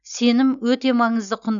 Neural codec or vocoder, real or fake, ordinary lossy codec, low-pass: none; real; none; 7.2 kHz